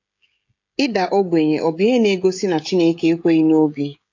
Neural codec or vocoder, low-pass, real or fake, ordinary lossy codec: codec, 16 kHz, 16 kbps, FreqCodec, smaller model; 7.2 kHz; fake; AAC, 48 kbps